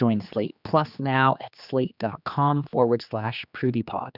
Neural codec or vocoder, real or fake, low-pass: codec, 16 kHz, 2 kbps, X-Codec, HuBERT features, trained on general audio; fake; 5.4 kHz